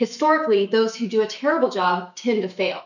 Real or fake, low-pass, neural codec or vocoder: fake; 7.2 kHz; autoencoder, 48 kHz, 128 numbers a frame, DAC-VAE, trained on Japanese speech